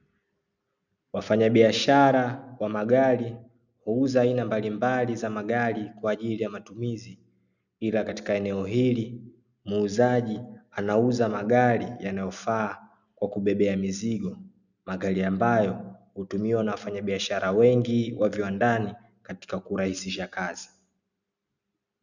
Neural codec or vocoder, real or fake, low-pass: none; real; 7.2 kHz